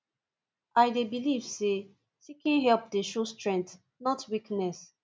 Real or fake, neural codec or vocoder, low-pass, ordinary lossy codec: real; none; none; none